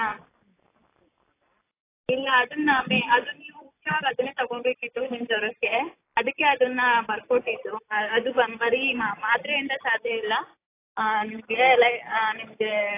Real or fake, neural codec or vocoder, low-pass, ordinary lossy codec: real; none; 3.6 kHz; AAC, 24 kbps